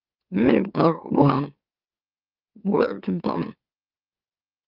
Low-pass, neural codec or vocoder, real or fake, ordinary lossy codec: 5.4 kHz; autoencoder, 44.1 kHz, a latent of 192 numbers a frame, MeloTTS; fake; Opus, 32 kbps